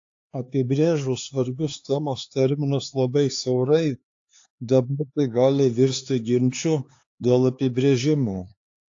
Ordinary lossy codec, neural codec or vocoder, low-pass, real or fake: AAC, 48 kbps; codec, 16 kHz, 4 kbps, X-Codec, HuBERT features, trained on LibriSpeech; 7.2 kHz; fake